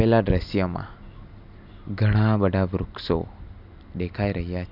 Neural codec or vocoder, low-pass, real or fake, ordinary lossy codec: none; 5.4 kHz; real; none